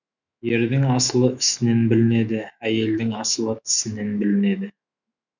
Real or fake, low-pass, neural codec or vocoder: fake; 7.2 kHz; autoencoder, 48 kHz, 128 numbers a frame, DAC-VAE, trained on Japanese speech